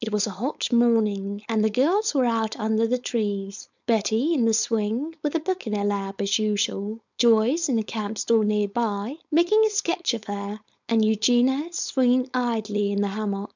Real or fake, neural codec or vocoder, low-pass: fake; codec, 16 kHz, 4.8 kbps, FACodec; 7.2 kHz